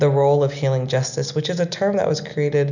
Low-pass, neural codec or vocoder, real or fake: 7.2 kHz; none; real